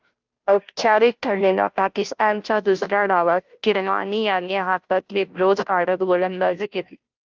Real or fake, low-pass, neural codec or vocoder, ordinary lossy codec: fake; 7.2 kHz; codec, 16 kHz, 0.5 kbps, FunCodec, trained on Chinese and English, 25 frames a second; Opus, 24 kbps